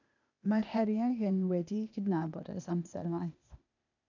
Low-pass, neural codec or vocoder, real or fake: 7.2 kHz; codec, 16 kHz, 0.8 kbps, ZipCodec; fake